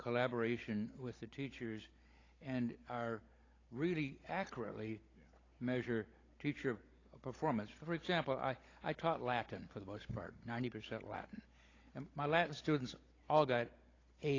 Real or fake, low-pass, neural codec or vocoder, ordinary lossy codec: fake; 7.2 kHz; codec, 16 kHz, 16 kbps, FunCodec, trained on Chinese and English, 50 frames a second; AAC, 32 kbps